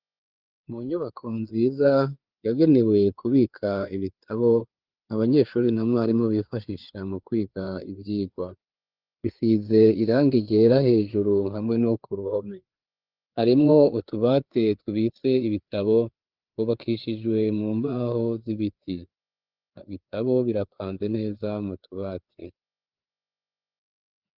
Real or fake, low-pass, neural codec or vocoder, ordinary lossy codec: fake; 5.4 kHz; codec, 16 kHz, 4 kbps, FreqCodec, larger model; Opus, 24 kbps